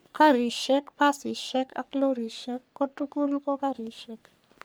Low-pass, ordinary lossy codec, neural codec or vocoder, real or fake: none; none; codec, 44.1 kHz, 3.4 kbps, Pupu-Codec; fake